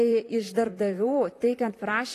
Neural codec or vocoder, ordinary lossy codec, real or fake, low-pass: vocoder, 44.1 kHz, 128 mel bands, Pupu-Vocoder; AAC, 48 kbps; fake; 14.4 kHz